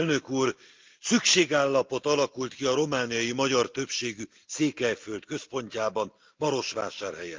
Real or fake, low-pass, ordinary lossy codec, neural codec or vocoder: real; 7.2 kHz; Opus, 24 kbps; none